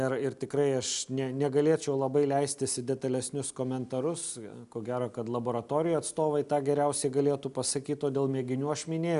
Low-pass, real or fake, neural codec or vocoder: 10.8 kHz; real; none